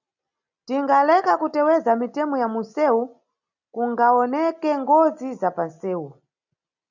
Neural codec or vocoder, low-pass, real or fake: none; 7.2 kHz; real